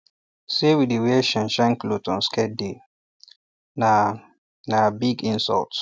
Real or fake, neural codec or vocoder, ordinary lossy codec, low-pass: real; none; none; none